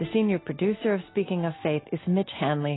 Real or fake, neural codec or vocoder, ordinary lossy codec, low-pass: real; none; AAC, 16 kbps; 7.2 kHz